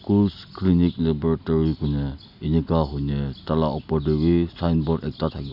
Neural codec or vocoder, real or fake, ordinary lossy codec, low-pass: none; real; none; 5.4 kHz